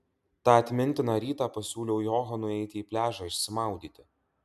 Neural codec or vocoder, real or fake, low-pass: none; real; 14.4 kHz